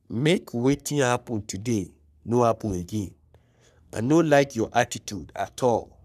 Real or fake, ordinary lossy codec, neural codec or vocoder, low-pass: fake; none; codec, 44.1 kHz, 3.4 kbps, Pupu-Codec; 14.4 kHz